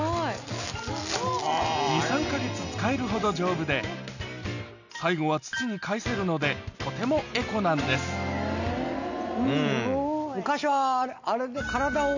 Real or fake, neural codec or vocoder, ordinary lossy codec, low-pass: real; none; none; 7.2 kHz